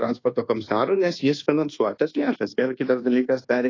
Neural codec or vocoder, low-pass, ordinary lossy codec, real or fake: codec, 24 kHz, 1.2 kbps, DualCodec; 7.2 kHz; AAC, 32 kbps; fake